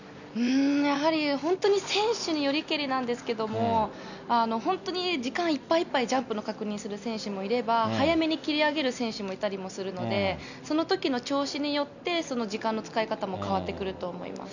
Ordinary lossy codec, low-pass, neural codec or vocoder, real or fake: none; 7.2 kHz; none; real